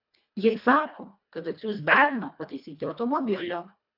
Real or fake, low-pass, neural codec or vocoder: fake; 5.4 kHz; codec, 24 kHz, 1.5 kbps, HILCodec